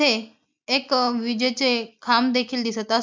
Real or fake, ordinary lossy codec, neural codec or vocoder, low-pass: real; MP3, 64 kbps; none; 7.2 kHz